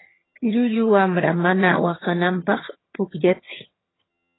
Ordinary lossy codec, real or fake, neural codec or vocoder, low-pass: AAC, 16 kbps; fake; vocoder, 22.05 kHz, 80 mel bands, HiFi-GAN; 7.2 kHz